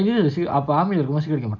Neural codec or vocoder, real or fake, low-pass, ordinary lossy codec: none; real; 7.2 kHz; none